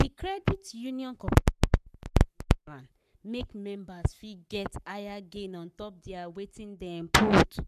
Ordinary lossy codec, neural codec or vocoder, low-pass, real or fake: none; codec, 44.1 kHz, 7.8 kbps, Pupu-Codec; 14.4 kHz; fake